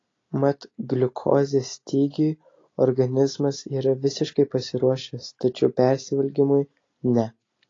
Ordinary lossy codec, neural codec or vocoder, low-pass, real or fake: AAC, 32 kbps; none; 7.2 kHz; real